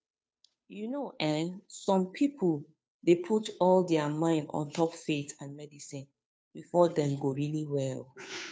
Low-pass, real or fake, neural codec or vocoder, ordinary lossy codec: none; fake; codec, 16 kHz, 2 kbps, FunCodec, trained on Chinese and English, 25 frames a second; none